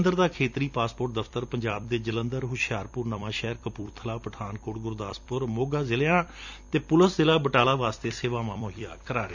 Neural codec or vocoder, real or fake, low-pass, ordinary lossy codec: vocoder, 44.1 kHz, 128 mel bands every 256 samples, BigVGAN v2; fake; 7.2 kHz; none